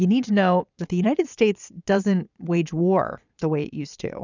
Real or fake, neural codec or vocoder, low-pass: fake; vocoder, 22.05 kHz, 80 mel bands, Vocos; 7.2 kHz